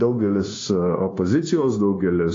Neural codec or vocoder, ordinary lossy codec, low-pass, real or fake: codec, 16 kHz, 0.9 kbps, LongCat-Audio-Codec; AAC, 32 kbps; 7.2 kHz; fake